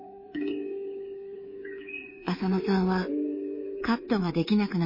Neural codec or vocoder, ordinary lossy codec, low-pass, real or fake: codec, 24 kHz, 3.1 kbps, DualCodec; MP3, 24 kbps; 5.4 kHz; fake